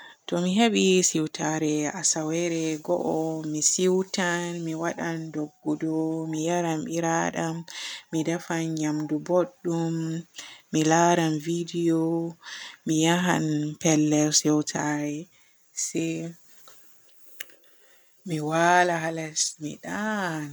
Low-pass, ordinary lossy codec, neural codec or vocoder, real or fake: none; none; none; real